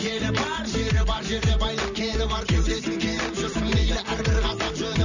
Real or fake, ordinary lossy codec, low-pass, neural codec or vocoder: real; none; 7.2 kHz; none